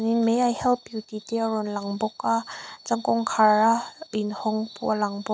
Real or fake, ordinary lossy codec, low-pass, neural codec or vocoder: real; none; none; none